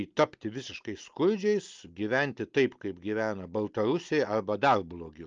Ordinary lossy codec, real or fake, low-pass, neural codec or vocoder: Opus, 24 kbps; real; 7.2 kHz; none